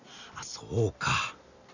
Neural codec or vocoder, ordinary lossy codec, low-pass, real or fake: none; none; 7.2 kHz; real